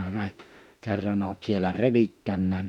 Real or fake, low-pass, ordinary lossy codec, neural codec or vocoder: fake; 19.8 kHz; none; autoencoder, 48 kHz, 32 numbers a frame, DAC-VAE, trained on Japanese speech